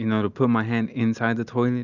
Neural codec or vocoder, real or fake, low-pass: none; real; 7.2 kHz